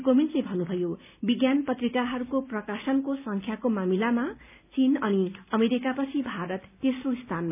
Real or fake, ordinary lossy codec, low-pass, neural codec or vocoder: real; none; 3.6 kHz; none